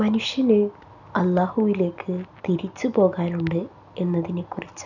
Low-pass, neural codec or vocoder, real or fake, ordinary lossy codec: 7.2 kHz; none; real; none